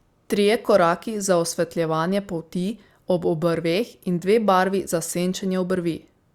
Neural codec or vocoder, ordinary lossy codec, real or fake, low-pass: none; Opus, 64 kbps; real; 19.8 kHz